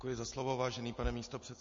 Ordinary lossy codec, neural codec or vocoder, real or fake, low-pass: MP3, 32 kbps; none; real; 7.2 kHz